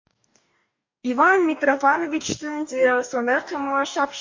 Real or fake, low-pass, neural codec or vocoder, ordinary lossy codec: fake; 7.2 kHz; codec, 44.1 kHz, 2.6 kbps, DAC; MP3, 48 kbps